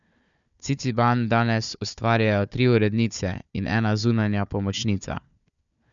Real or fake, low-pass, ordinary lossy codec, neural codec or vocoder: fake; 7.2 kHz; none; codec, 16 kHz, 4 kbps, FunCodec, trained on Chinese and English, 50 frames a second